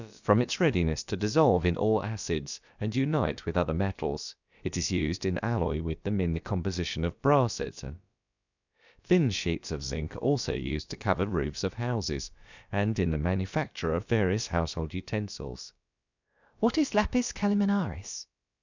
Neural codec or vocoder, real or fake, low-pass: codec, 16 kHz, about 1 kbps, DyCAST, with the encoder's durations; fake; 7.2 kHz